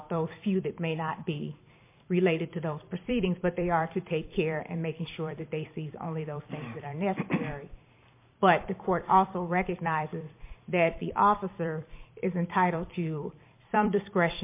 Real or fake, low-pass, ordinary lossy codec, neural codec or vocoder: fake; 3.6 kHz; MP3, 24 kbps; vocoder, 44.1 kHz, 128 mel bands every 256 samples, BigVGAN v2